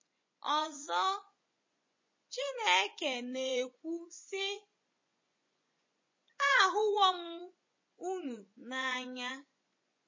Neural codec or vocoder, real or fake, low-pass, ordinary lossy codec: vocoder, 24 kHz, 100 mel bands, Vocos; fake; 7.2 kHz; MP3, 32 kbps